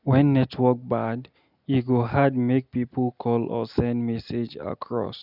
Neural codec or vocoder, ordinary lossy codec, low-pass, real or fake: none; none; 5.4 kHz; real